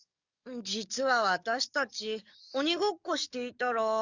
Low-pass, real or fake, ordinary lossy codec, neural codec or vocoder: 7.2 kHz; fake; Opus, 64 kbps; codec, 44.1 kHz, 7.8 kbps, DAC